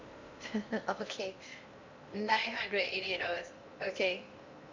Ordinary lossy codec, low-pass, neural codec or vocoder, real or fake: MP3, 64 kbps; 7.2 kHz; codec, 16 kHz in and 24 kHz out, 0.8 kbps, FocalCodec, streaming, 65536 codes; fake